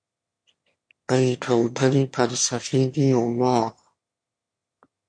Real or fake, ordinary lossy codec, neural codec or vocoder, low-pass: fake; MP3, 48 kbps; autoencoder, 22.05 kHz, a latent of 192 numbers a frame, VITS, trained on one speaker; 9.9 kHz